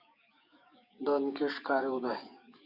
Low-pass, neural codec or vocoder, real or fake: 5.4 kHz; codec, 44.1 kHz, 7.8 kbps, DAC; fake